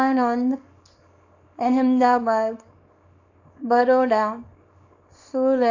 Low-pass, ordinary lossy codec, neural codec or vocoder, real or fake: 7.2 kHz; AAC, 48 kbps; codec, 24 kHz, 0.9 kbps, WavTokenizer, small release; fake